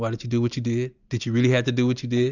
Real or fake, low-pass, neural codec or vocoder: real; 7.2 kHz; none